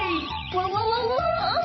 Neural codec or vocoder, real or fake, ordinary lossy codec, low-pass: vocoder, 44.1 kHz, 80 mel bands, Vocos; fake; MP3, 24 kbps; 7.2 kHz